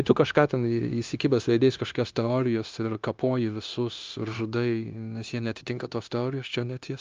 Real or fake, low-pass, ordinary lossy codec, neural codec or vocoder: fake; 7.2 kHz; Opus, 24 kbps; codec, 16 kHz, 0.9 kbps, LongCat-Audio-Codec